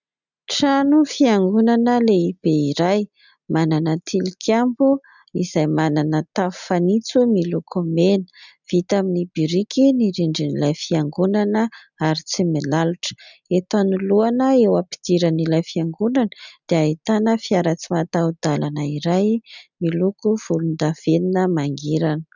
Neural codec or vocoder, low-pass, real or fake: none; 7.2 kHz; real